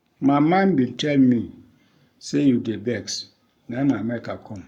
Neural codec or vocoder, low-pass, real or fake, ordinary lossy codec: codec, 44.1 kHz, 7.8 kbps, Pupu-Codec; 19.8 kHz; fake; none